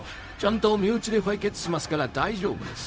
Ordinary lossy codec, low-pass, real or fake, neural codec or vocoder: none; none; fake; codec, 16 kHz, 0.4 kbps, LongCat-Audio-Codec